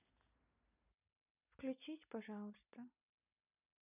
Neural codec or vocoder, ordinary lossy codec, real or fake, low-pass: none; none; real; 3.6 kHz